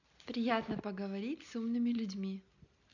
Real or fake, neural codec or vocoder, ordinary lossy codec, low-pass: real; none; none; 7.2 kHz